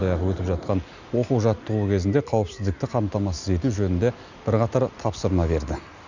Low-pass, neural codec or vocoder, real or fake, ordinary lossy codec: 7.2 kHz; none; real; none